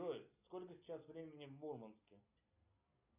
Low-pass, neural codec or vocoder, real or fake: 3.6 kHz; none; real